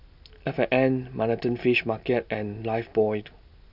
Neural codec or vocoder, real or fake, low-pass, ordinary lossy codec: none; real; 5.4 kHz; none